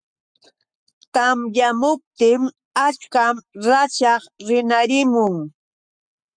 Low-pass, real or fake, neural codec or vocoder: 9.9 kHz; fake; codec, 44.1 kHz, 7.8 kbps, Pupu-Codec